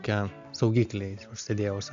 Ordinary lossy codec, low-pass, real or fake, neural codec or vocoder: AAC, 64 kbps; 7.2 kHz; real; none